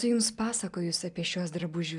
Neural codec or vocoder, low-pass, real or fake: none; 10.8 kHz; real